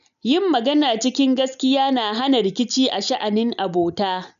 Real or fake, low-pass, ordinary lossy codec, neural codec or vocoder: real; 7.2 kHz; none; none